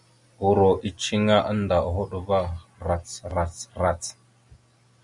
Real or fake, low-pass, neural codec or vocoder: real; 10.8 kHz; none